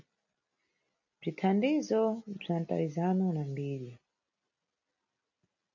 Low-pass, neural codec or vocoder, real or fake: 7.2 kHz; none; real